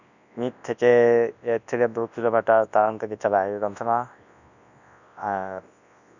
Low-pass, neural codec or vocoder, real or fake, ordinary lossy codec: 7.2 kHz; codec, 24 kHz, 0.9 kbps, WavTokenizer, large speech release; fake; none